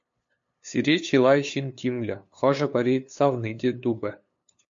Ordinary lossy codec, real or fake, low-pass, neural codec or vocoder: MP3, 48 kbps; fake; 7.2 kHz; codec, 16 kHz, 2 kbps, FunCodec, trained on LibriTTS, 25 frames a second